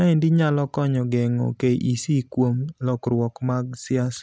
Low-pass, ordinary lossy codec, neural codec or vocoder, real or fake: none; none; none; real